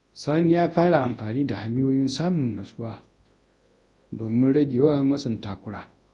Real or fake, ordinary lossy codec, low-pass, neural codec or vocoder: fake; AAC, 32 kbps; 10.8 kHz; codec, 24 kHz, 0.9 kbps, WavTokenizer, large speech release